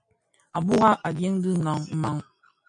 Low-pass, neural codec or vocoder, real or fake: 9.9 kHz; none; real